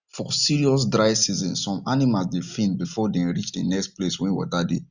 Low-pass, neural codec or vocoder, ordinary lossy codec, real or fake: 7.2 kHz; none; none; real